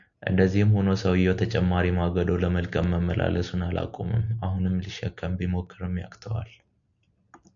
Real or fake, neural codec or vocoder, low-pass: real; none; 7.2 kHz